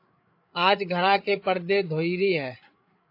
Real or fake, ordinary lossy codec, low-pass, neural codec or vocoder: fake; AAC, 32 kbps; 5.4 kHz; codec, 16 kHz, 8 kbps, FreqCodec, larger model